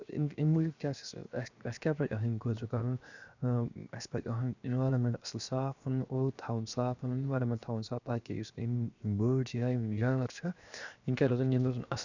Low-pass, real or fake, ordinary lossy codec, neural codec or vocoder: 7.2 kHz; fake; none; codec, 16 kHz, 0.7 kbps, FocalCodec